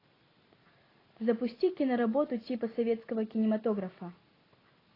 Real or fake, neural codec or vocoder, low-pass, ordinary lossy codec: real; none; 5.4 kHz; AAC, 24 kbps